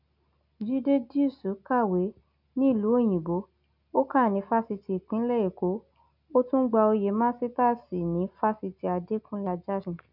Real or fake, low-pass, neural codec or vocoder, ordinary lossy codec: real; 5.4 kHz; none; none